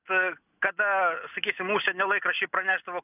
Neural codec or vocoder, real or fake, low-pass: none; real; 3.6 kHz